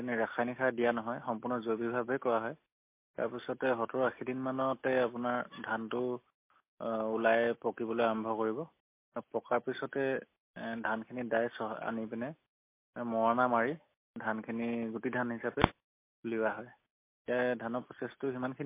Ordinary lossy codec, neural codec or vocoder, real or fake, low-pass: MP3, 24 kbps; none; real; 3.6 kHz